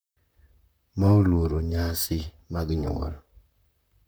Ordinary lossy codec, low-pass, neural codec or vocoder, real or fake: none; none; vocoder, 44.1 kHz, 128 mel bands, Pupu-Vocoder; fake